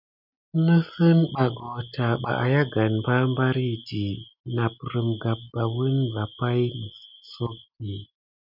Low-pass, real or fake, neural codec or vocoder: 5.4 kHz; real; none